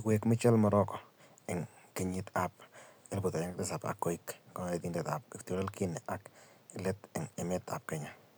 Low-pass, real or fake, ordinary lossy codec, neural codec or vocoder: none; real; none; none